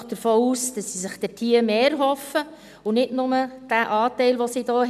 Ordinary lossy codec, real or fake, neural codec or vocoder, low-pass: none; real; none; 14.4 kHz